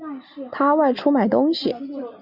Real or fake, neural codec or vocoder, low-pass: real; none; 5.4 kHz